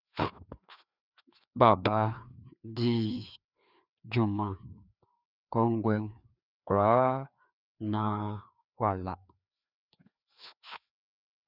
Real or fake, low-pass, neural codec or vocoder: fake; 5.4 kHz; codec, 16 kHz, 2 kbps, FreqCodec, larger model